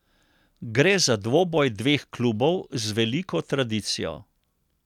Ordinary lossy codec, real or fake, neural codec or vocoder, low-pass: none; real; none; 19.8 kHz